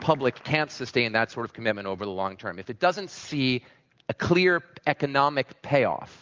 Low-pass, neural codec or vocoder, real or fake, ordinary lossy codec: 7.2 kHz; none; real; Opus, 24 kbps